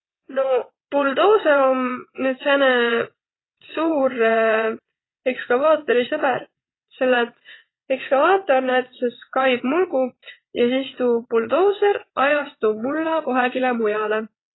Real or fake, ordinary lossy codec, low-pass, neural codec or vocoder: fake; AAC, 16 kbps; 7.2 kHz; vocoder, 22.05 kHz, 80 mel bands, WaveNeXt